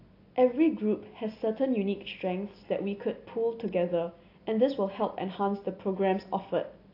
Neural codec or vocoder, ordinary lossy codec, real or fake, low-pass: none; AAC, 32 kbps; real; 5.4 kHz